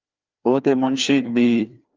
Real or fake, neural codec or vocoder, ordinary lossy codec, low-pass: fake; codec, 16 kHz, 2 kbps, FreqCodec, larger model; Opus, 24 kbps; 7.2 kHz